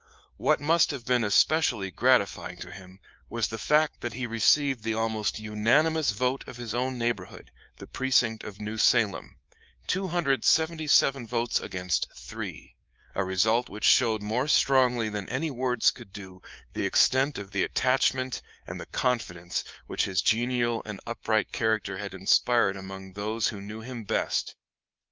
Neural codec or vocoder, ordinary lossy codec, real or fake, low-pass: codec, 24 kHz, 3.1 kbps, DualCodec; Opus, 24 kbps; fake; 7.2 kHz